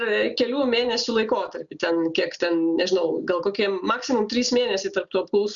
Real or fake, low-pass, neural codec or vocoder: real; 7.2 kHz; none